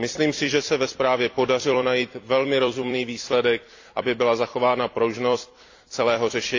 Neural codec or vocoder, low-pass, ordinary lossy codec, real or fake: vocoder, 44.1 kHz, 128 mel bands every 256 samples, BigVGAN v2; 7.2 kHz; AAC, 48 kbps; fake